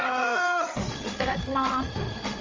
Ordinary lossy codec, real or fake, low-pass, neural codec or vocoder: Opus, 32 kbps; fake; 7.2 kHz; codec, 16 kHz, 8 kbps, FreqCodec, larger model